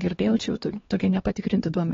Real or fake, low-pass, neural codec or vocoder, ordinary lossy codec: fake; 7.2 kHz; codec, 16 kHz, 2 kbps, X-Codec, WavLM features, trained on Multilingual LibriSpeech; AAC, 24 kbps